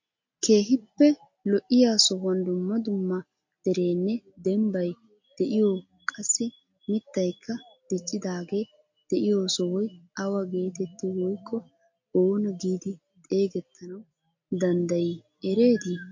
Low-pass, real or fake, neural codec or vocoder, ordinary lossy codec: 7.2 kHz; real; none; MP3, 48 kbps